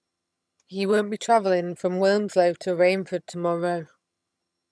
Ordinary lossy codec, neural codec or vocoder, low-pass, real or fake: none; vocoder, 22.05 kHz, 80 mel bands, HiFi-GAN; none; fake